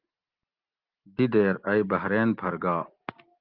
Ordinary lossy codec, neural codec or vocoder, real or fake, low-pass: Opus, 32 kbps; none; real; 5.4 kHz